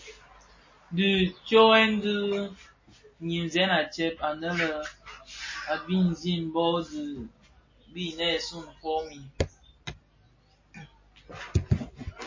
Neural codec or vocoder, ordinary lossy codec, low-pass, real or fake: none; MP3, 32 kbps; 7.2 kHz; real